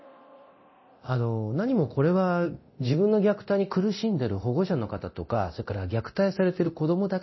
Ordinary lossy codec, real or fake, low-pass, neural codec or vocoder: MP3, 24 kbps; fake; 7.2 kHz; codec, 24 kHz, 0.9 kbps, DualCodec